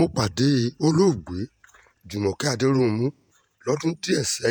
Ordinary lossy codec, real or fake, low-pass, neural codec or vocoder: none; fake; none; vocoder, 48 kHz, 128 mel bands, Vocos